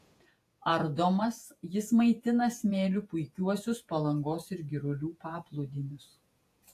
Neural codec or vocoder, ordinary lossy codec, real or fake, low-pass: vocoder, 48 kHz, 128 mel bands, Vocos; AAC, 64 kbps; fake; 14.4 kHz